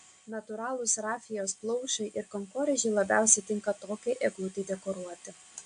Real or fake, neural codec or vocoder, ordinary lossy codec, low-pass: real; none; AAC, 64 kbps; 9.9 kHz